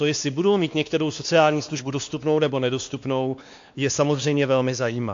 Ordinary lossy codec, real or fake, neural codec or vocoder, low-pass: AAC, 64 kbps; fake; codec, 16 kHz, 2 kbps, X-Codec, WavLM features, trained on Multilingual LibriSpeech; 7.2 kHz